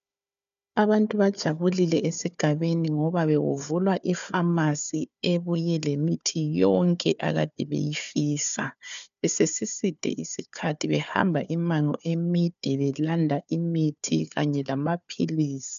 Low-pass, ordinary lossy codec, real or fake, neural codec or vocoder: 7.2 kHz; MP3, 96 kbps; fake; codec, 16 kHz, 4 kbps, FunCodec, trained on Chinese and English, 50 frames a second